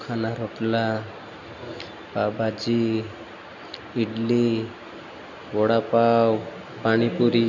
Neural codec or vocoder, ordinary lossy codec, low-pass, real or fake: none; none; 7.2 kHz; real